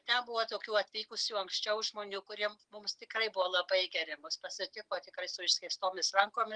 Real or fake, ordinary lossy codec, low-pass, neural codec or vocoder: real; Opus, 32 kbps; 9.9 kHz; none